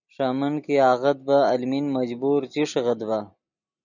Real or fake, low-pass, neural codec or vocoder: real; 7.2 kHz; none